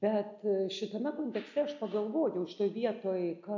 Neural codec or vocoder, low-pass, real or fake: none; 7.2 kHz; real